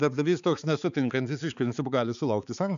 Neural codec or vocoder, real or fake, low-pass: codec, 16 kHz, 4 kbps, X-Codec, HuBERT features, trained on balanced general audio; fake; 7.2 kHz